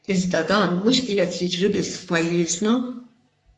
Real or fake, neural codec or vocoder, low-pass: fake; codec, 44.1 kHz, 3.4 kbps, Pupu-Codec; 10.8 kHz